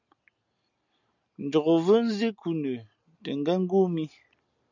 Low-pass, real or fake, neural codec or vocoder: 7.2 kHz; real; none